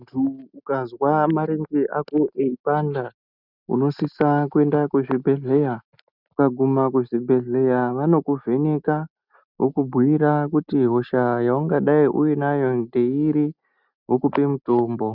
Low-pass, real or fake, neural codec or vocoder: 5.4 kHz; real; none